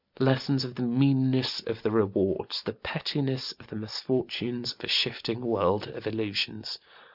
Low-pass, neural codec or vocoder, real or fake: 5.4 kHz; none; real